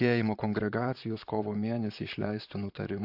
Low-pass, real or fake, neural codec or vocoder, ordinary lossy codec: 5.4 kHz; fake; vocoder, 44.1 kHz, 128 mel bands, Pupu-Vocoder; AAC, 48 kbps